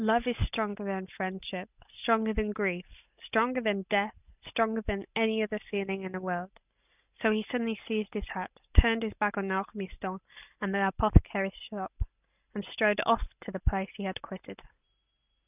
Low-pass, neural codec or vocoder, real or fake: 3.6 kHz; none; real